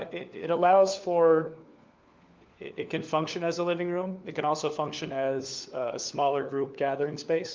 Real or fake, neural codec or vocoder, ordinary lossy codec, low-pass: fake; codec, 16 kHz, 4 kbps, FunCodec, trained on LibriTTS, 50 frames a second; Opus, 24 kbps; 7.2 kHz